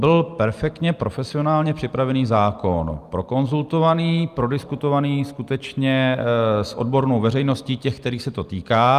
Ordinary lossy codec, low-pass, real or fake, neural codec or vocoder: Opus, 32 kbps; 14.4 kHz; real; none